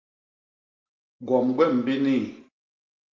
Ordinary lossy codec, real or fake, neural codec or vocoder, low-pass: Opus, 32 kbps; real; none; 7.2 kHz